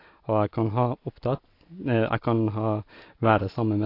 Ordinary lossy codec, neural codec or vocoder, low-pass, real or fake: AAC, 32 kbps; none; 5.4 kHz; real